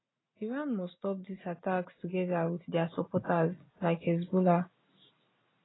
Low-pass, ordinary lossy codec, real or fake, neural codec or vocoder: 7.2 kHz; AAC, 16 kbps; real; none